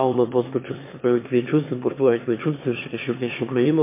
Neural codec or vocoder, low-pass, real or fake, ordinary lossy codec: autoencoder, 22.05 kHz, a latent of 192 numbers a frame, VITS, trained on one speaker; 3.6 kHz; fake; MP3, 24 kbps